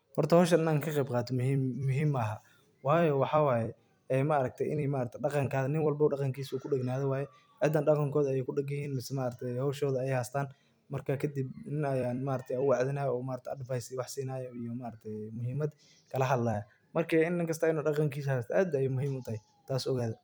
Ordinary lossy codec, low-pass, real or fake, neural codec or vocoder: none; none; fake; vocoder, 44.1 kHz, 128 mel bands every 256 samples, BigVGAN v2